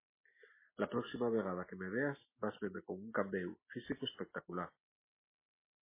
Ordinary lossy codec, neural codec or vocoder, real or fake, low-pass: MP3, 16 kbps; none; real; 3.6 kHz